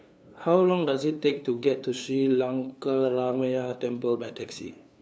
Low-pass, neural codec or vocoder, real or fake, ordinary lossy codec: none; codec, 16 kHz, 2 kbps, FunCodec, trained on LibriTTS, 25 frames a second; fake; none